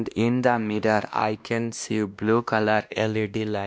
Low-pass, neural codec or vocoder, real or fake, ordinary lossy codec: none; codec, 16 kHz, 1 kbps, X-Codec, WavLM features, trained on Multilingual LibriSpeech; fake; none